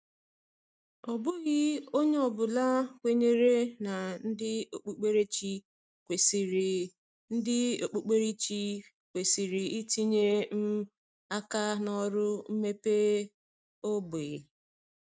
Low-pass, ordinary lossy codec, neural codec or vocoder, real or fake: none; none; none; real